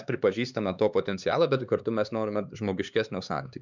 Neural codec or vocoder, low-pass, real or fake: codec, 16 kHz, 4 kbps, X-Codec, HuBERT features, trained on LibriSpeech; 7.2 kHz; fake